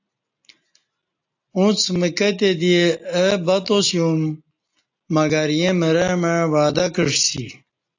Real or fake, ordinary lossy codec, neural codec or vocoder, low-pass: real; AAC, 48 kbps; none; 7.2 kHz